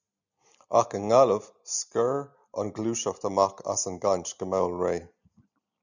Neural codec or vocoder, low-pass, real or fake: none; 7.2 kHz; real